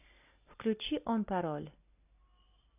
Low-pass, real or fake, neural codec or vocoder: 3.6 kHz; real; none